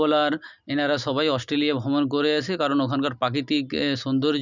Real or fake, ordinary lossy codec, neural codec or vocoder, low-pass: real; none; none; 7.2 kHz